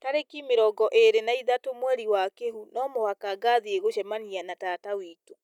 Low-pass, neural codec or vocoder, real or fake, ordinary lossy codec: 19.8 kHz; none; real; none